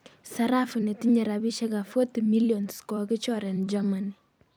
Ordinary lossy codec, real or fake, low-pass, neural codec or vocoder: none; fake; none; vocoder, 44.1 kHz, 128 mel bands every 256 samples, BigVGAN v2